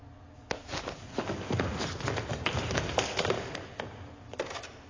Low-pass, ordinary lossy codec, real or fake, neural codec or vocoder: 7.2 kHz; AAC, 32 kbps; real; none